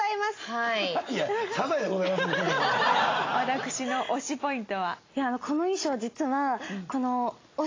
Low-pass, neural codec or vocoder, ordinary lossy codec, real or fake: 7.2 kHz; none; AAC, 32 kbps; real